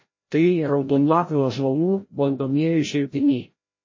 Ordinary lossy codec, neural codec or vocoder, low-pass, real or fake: MP3, 32 kbps; codec, 16 kHz, 0.5 kbps, FreqCodec, larger model; 7.2 kHz; fake